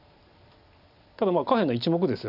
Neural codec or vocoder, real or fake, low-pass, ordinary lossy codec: none; real; 5.4 kHz; none